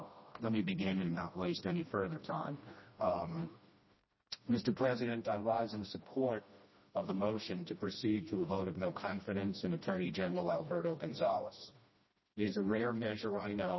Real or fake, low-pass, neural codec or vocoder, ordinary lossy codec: fake; 7.2 kHz; codec, 16 kHz, 1 kbps, FreqCodec, smaller model; MP3, 24 kbps